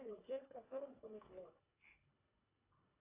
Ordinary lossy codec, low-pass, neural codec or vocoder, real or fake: AAC, 16 kbps; 3.6 kHz; codec, 24 kHz, 1.5 kbps, HILCodec; fake